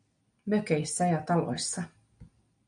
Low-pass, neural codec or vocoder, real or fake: 9.9 kHz; none; real